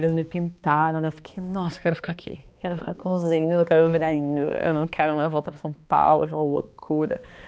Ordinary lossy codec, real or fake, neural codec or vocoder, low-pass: none; fake; codec, 16 kHz, 2 kbps, X-Codec, HuBERT features, trained on balanced general audio; none